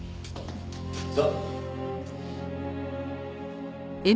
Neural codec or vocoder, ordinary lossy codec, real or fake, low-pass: none; none; real; none